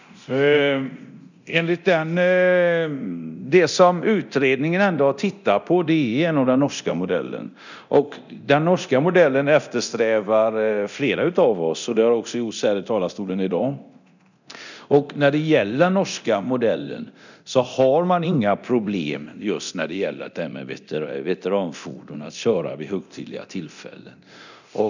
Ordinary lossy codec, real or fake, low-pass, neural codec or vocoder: none; fake; 7.2 kHz; codec, 24 kHz, 0.9 kbps, DualCodec